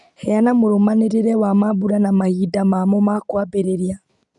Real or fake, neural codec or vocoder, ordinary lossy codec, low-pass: real; none; none; 10.8 kHz